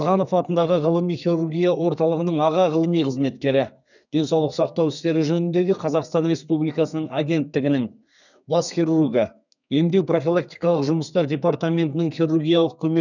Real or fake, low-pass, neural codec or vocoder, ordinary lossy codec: fake; 7.2 kHz; codec, 32 kHz, 1.9 kbps, SNAC; none